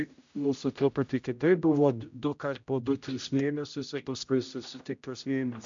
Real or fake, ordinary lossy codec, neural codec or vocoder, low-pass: fake; MP3, 64 kbps; codec, 16 kHz, 0.5 kbps, X-Codec, HuBERT features, trained on general audio; 7.2 kHz